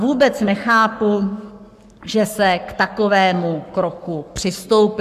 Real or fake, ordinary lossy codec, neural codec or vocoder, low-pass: fake; MP3, 96 kbps; codec, 44.1 kHz, 7.8 kbps, Pupu-Codec; 14.4 kHz